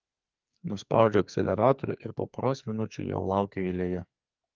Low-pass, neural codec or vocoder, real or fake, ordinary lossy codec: 7.2 kHz; codec, 44.1 kHz, 2.6 kbps, SNAC; fake; Opus, 32 kbps